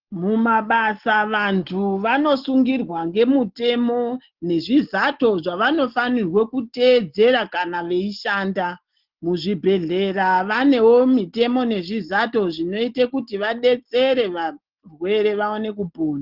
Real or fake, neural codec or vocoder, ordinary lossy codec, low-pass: real; none; Opus, 16 kbps; 5.4 kHz